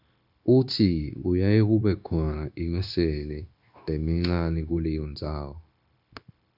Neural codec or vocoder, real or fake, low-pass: codec, 16 kHz, 0.9 kbps, LongCat-Audio-Codec; fake; 5.4 kHz